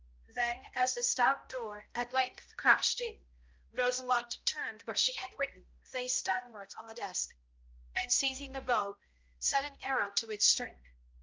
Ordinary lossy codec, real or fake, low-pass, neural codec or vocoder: Opus, 32 kbps; fake; 7.2 kHz; codec, 16 kHz, 0.5 kbps, X-Codec, HuBERT features, trained on balanced general audio